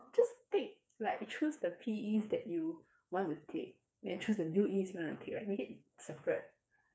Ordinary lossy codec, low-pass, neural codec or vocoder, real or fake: none; none; codec, 16 kHz, 4 kbps, FreqCodec, smaller model; fake